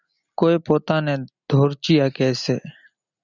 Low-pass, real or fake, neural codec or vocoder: 7.2 kHz; real; none